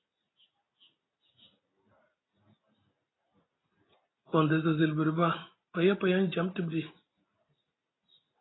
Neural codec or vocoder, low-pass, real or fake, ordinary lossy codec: none; 7.2 kHz; real; AAC, 16 kbps